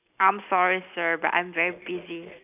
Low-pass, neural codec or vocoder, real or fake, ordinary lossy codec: 3.6 kHz; none; real; none